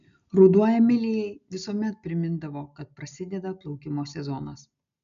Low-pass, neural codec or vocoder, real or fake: 7.2 kHz; none; real